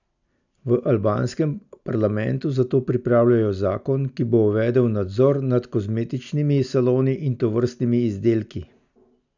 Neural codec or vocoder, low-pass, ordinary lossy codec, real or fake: none; 7.2 kHz; none; real